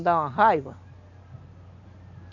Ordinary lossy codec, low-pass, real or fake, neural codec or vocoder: none; 7.2 kHz; real; none